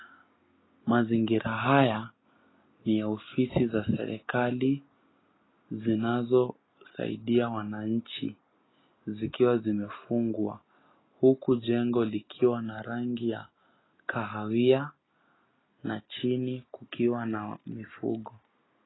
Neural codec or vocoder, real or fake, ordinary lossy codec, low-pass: none; real; AAC, 16 kbps; 7.2 kHz